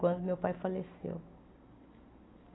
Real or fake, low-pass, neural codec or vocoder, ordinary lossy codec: real; 7.2 kHz; none; AAC, 16 kbps